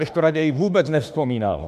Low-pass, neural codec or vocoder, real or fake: 14.4 kHz; autoencoder, 48 kHz, 32 numbers a frame, DAC-VAE, trained on Japanese speech; fake